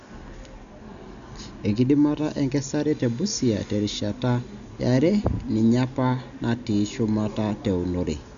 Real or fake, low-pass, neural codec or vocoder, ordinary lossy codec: real; 7.2 kHz; none; none